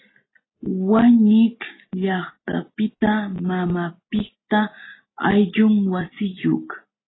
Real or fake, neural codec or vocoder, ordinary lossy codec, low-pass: real; none; AAC, 16 kbps; 7.2 kHz